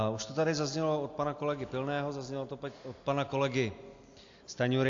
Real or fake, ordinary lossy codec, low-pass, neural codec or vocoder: real; AAC, 48 kbps; 7.2 kHz; none